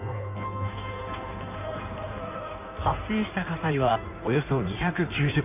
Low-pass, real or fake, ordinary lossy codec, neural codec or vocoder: 3.6 kHz; fake; none; codec, 16 kHz in and 24 kHz out, 1.1 kbps, FireRedTTS-2 codec